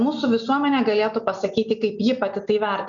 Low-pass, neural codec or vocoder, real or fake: 7.2 kHz; none; real